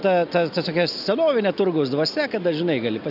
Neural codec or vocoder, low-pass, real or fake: none; 5.4 kHz; real